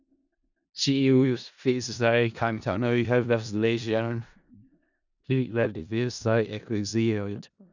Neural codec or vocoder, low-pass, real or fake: codec, 16 kHz in and 24 kHz out, 0.4 kbps, LongCat-Audio-Codec, four codebook decoder; 7.2 kHz; fake